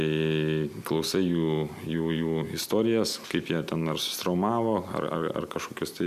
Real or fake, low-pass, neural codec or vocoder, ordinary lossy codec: real; 14.4 kHz; none; MP3, 96 kbps